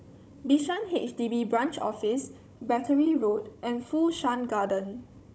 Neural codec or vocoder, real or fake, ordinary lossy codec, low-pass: codec, 16 kHz, 16 kbps, FunCodec, trained on Chinese and English, 50 frames a second; fake; none; none